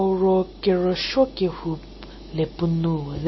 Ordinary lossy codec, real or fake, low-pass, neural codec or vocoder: MP3, 24 kbps; real; 7.2 kHz; none